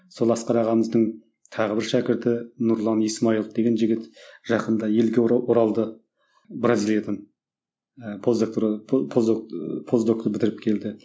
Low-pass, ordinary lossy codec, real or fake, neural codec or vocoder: none; none; real; none